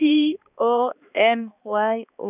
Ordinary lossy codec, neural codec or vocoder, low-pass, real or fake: none; codec, 16 kHz, 2 kbps, X-Codec, HuBERT features, trained on balanced general audio; 3.6 kHz; fake